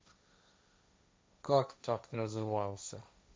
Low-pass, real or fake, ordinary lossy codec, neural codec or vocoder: none; fake; none; codec, 16 kHz, 1.1 kbps, Voila-Tokenizer